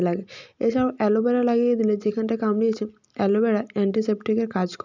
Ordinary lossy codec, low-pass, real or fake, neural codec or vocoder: none; 7.2 kHz; real; none